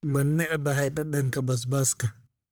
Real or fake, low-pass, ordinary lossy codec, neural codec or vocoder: fake; none; none; codec, 44.1 kHz, 1.7 kbps, Pupu-Codec